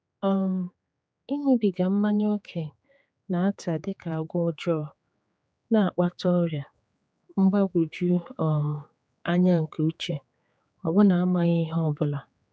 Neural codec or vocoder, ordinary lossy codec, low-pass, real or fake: codec, 16 kHz, 4 kbps, X-Codec, HuBERT features, trained on general audio; none; none; fake